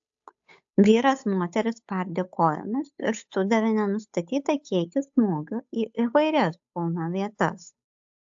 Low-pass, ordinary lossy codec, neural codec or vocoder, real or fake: 7.2 kHz; AAC, 64 kbps; codec, 16 kHz, 8 kbps, FunCodec, trained on Chinese and English, 25 frames a second; fake